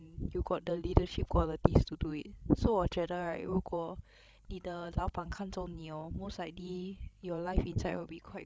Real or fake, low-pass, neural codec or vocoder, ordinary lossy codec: fake; none; codec, 16 kHz, 16 kbps, FreqCodec, larger model; none